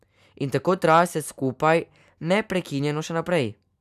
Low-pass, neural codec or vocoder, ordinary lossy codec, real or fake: 14.4 kHz; none; none; real